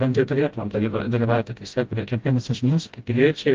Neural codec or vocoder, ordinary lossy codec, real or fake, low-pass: codec, 16 kHz, 0.5 kbps, FreqCodec, smaller model; Opus, 32 kbps; fake; 7.2 kHz